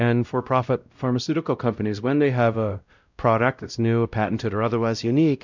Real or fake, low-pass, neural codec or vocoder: fake; 7.2 kHz; codec, 16 kHz, 0.5 kbps, X-Codec, WavLM features, trained on Multilingual LibriSpeech